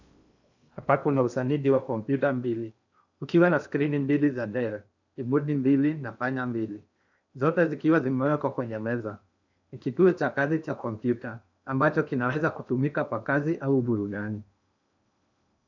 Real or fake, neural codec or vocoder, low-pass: fake; codec, 16 kHz in and 24 kHz out, 0.8 kbps, FocalCodec, streaming, 65536 codes; 7.2 kHz